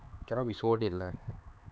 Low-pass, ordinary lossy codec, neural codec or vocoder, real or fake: none; none; codec, 16 kHz, 4 kbps, X-Codec, HuBERT features, trained on LibriSpeech; fake